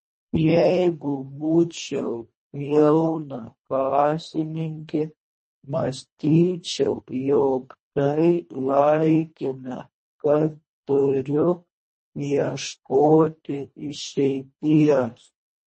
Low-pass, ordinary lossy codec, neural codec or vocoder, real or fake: 10.8 kHz; MP3, 32 kbps; codec, 24 kHz, 1.5 kbps, HILCodec; fake